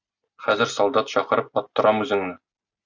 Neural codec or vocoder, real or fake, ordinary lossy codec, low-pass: none; real; Opus, 64 kbps; 7.2 kHz